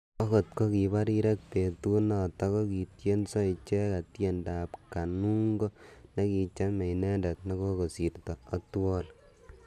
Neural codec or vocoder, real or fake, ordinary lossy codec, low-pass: none; real; none; 14.4 kHz